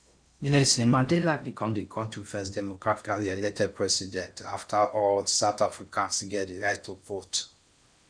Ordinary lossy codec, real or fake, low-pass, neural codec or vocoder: none; fake; 9.9 kHz; codec, 16 kHz in and 24 kHz out, 0.6 kbps, FocalCodec, streaming, 4096 codes